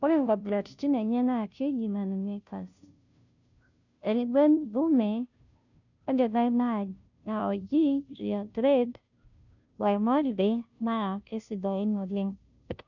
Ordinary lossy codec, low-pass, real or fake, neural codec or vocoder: none; 7.2 kHz; fake; codec, 16 kHz, 0.5 kbps, FunCodec, trained on Chinese and English, 25 frames a second